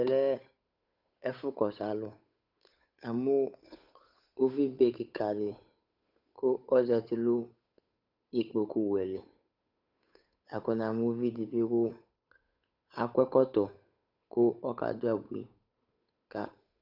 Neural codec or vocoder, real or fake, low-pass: codec, 16 kHz, 8 kbps, FunCodec, trained on Chinese and English, 25 frames a second; fake; 5.4 kHz